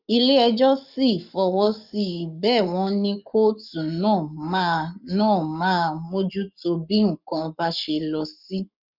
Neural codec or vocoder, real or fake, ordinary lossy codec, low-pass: codec, 44.1 kHz, 7.8 kbps, Pupu-Codec; fake; none; 5.4 kHz